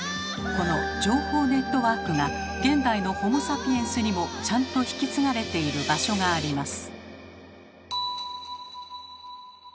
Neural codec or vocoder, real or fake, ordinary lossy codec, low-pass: none; real; none; none